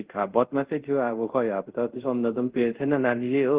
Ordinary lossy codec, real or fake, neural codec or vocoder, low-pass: Opus, 16 kbps; fake; codec, 24 kHz, 0.5 kbps, DualCodec; 3.6 kHz